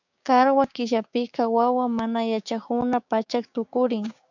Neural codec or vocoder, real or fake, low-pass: codec, 16 kHz, 6 kbps, DAC; fake; 7.2 kHz